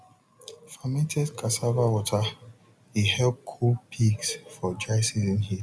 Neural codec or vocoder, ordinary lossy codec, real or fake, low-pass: none; none; real; none